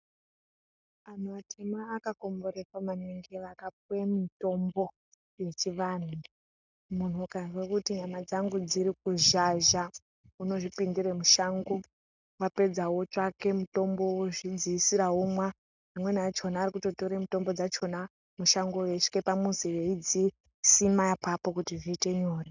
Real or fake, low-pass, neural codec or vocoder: real; 7.2 kHz; none